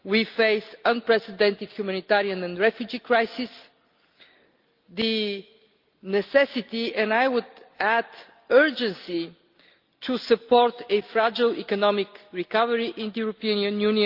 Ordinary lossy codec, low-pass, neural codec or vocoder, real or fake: Opus, 32 kbps; 5.4 kHz; none; real